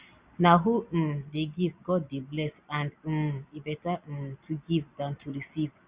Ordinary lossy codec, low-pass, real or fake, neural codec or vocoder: Opus, 64 kbps; 3.6 kHz; real; none